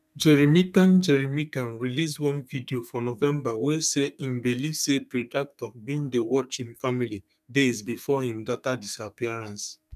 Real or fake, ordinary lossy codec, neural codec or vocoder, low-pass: fake; none; codec, 32 kHz, 1.9 kbps, SNAC; 14.4 kHz